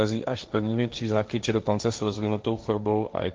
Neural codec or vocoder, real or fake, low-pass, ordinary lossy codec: codec, 16 kHz, 1.1 kbps, Voila-Tokenizer; fake; 7.2 kHz; Opus, 24 kbps